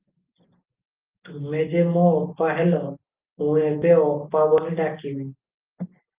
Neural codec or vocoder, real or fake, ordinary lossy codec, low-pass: none; real; Opus, 32 kbps; 3.6 kHz